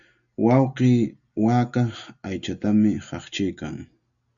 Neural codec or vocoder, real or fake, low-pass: none; real; 7.2 kHz